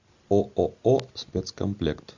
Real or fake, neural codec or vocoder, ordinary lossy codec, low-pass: real; none; Opus, 64 kbps; 7.2 kHz